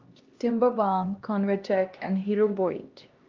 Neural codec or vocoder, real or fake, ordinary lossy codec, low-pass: codec, 16 kHz, 1 kbps, X-Codec, HuBERT features, trained on LibriSpeech; fake; Opus, 32 kbps; 7.2 kHz